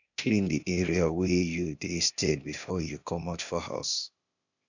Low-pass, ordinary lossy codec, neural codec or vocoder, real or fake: 7.2 kHz; none; codec, 16 kHz, 0.8 kbps, ZipCodec; fake